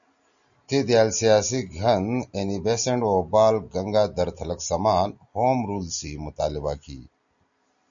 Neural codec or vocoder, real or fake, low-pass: none; real; 7.2 kHz